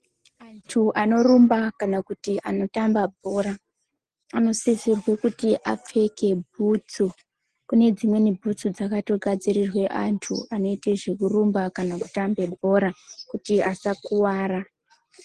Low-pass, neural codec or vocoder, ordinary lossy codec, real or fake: 9.9 kHz; none; Opus, 16 kbps; real